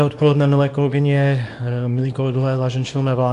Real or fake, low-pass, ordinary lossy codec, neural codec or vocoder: fake; 10.8 kHz; AAC, 64 kbps; codec, 24 kHz, 0.9 kbps, WavTokenizer, small release